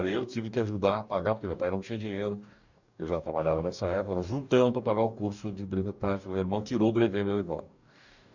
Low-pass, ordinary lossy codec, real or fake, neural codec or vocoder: 7.2 kHz; none; fake; codec, 44.1 kHz, 2.6 kbps, DAC